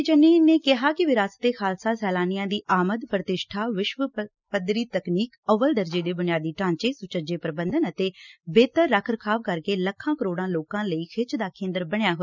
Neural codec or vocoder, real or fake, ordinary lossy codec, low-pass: none; real; none; 7.2 kHz